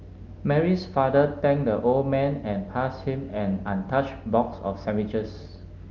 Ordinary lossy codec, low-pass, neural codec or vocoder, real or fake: Opus, 24 kbps; 7.2 kHz; none; real